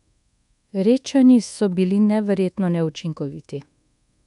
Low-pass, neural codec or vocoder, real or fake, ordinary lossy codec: 10.8 kHz; codec, 24 kHz, 1.2 kbps, DualCodec; fake; none